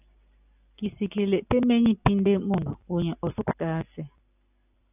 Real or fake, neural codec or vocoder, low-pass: real; none; 3.6 kHz